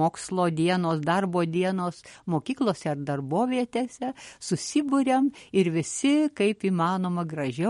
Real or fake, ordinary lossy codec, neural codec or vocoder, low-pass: real; MP3, 48 kbps; none; 19.8 kHz